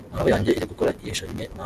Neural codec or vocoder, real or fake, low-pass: none; real; 14.4 kHz